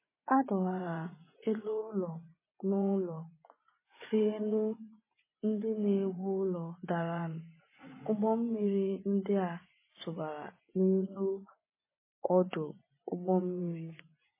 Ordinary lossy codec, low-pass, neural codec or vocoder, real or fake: MP3, 16 kbps; 3.6 kHz; vocoder, 24 kHz, 100 mel bands, Vocos; fake